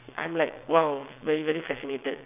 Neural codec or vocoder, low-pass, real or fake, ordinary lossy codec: vocoder, 22.05 kHz, 80 mel bands, WaveNeXt; 3.6 kHz; fake; none